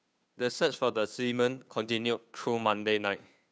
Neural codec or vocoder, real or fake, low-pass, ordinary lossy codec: codec, 16 kHz, 2 kbps, FunCodec, trained on Chinese and English, 25 frames a second; fake; none; none